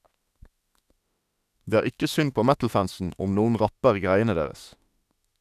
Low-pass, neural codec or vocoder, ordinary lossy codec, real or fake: 14.4 kHz; autoencoder, 48 kHz, 32 numbers a frame, DAC-VAE, trained on Japanese speech; none; fake